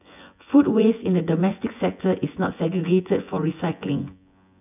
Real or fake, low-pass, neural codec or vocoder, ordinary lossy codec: fake; 3.6 kHz; vocoder, 24 kHz, 100 mel bands, Vocos; none